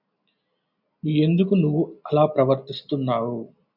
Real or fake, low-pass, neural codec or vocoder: real; 5.4 kHz; none